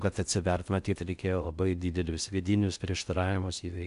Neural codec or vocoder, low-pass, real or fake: codec, 16 kHz in and 24 kHz out, 0.6 kbps, FocalCodec, streaming, 4096 codes; 10.8 kHz; fake